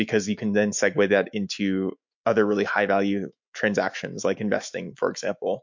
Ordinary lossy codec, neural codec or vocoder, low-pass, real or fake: MP3, 48 kbps; autoencoder, 48 kHz, 128 numbers a frame, DAC-VAE, trained on Japanese speech; 7.2 kHz; fake